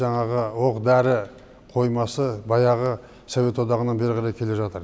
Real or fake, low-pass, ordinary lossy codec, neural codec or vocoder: real; none; none; none